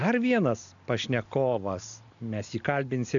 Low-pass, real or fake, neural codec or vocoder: 7.2 kHz; real; none